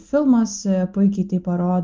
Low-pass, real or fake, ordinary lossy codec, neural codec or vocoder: 7.2 kHz; real; Opus, 32 kbps; none